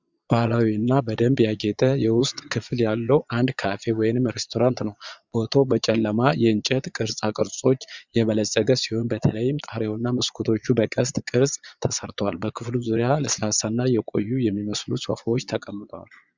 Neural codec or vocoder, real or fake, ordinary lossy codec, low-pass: vocoder, 22.05 kHz, 80 mel bands, Vocos; fake; Opus, 64 kbps; 7.2 kHz